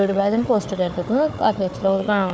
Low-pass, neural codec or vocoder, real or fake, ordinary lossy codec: none; codec, 16 kHz, 4 kbps, FunCodec, trained on Chinese and English, 50 frames a second; fake; none